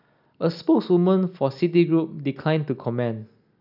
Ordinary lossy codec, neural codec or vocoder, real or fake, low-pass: none; none; real; 5.4 kHz